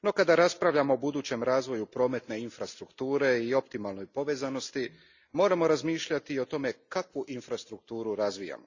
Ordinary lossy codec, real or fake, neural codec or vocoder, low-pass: Opus, 64 kbps; real; none; 7.2 kHz